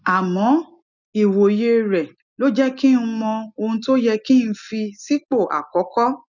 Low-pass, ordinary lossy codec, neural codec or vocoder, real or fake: 7.2 kHz; none; none; real